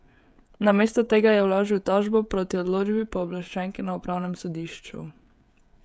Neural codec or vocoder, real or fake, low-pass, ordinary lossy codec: codec, 16 kHz, 16 kbps, FreqCodec, smaller model; fake; none; none